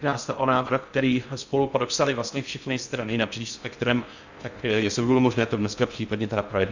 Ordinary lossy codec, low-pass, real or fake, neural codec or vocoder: Opus, 64 kbps; 7.2 kHz; fake; codec, 16 kHz in and 24 kHz out, 0.6 kbps, FocalCodec, streaming, 2048 codes